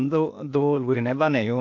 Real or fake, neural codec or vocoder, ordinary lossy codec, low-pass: fake; codec, 16 kHz, 0.8 kbps, ZipCodec; AAC, 48 kbps; 7.2 kHz